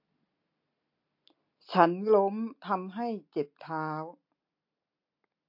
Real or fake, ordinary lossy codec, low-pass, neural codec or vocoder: real; MP3, 32 kbps; 5.4 kHz; none